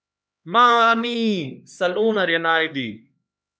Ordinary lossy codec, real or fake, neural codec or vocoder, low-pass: none; fake; codec, 16 kHz, 2 kbps, X-Codec, HuBERT features, trained on LibriSpeech; none